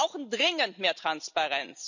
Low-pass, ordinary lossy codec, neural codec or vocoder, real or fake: 7.2 kHz; none; none; real